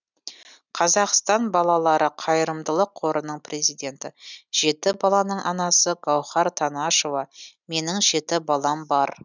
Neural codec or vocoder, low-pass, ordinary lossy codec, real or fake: none; 7.2 kHz; none; real